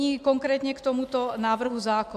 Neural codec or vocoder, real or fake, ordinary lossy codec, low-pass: none; real; AAC, 96 kbps; 14.4 kHz